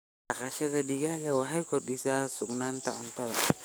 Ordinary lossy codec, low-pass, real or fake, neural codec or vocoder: none; none; fake; codec, 44.1 kHz, 7.8 kbps, DAC